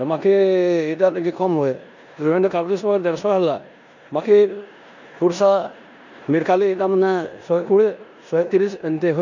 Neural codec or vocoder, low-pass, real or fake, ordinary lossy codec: codec, 16 kHz in and 24 kHz out, 0.9 kbps, LongCat-Audio-Codec, four codebook decoder; 7.2 kHz; fake; none